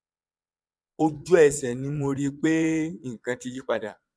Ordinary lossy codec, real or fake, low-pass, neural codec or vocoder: none; fake; none; vocoder, 22.05 kHz, 80 mel bands, Vocos